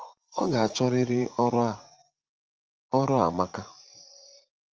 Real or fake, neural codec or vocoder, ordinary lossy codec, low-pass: fake; vocoder, 44.1 kHz, 80 mel bands, Vocos; Opus, 24 kbps; 7.2 kHz